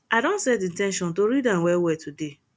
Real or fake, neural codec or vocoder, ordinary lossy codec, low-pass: real; none; none; none